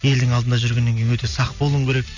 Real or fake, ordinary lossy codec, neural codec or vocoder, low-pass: real; none; none; 7.2 kHz